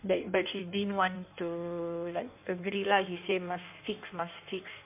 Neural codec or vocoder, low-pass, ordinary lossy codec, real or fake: codec, 16 kHz in and 24 kHz out, 1.1 kbps, FireRedTTS-2 codec; 3.6 kHz; MP3, 32 kbps; fake